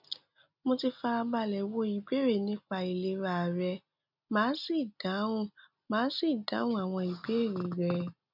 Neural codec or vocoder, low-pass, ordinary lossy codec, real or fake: none; 5.4 kHz; none; real